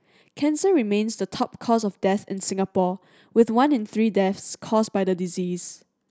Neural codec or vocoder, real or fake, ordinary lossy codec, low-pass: none; real; none; none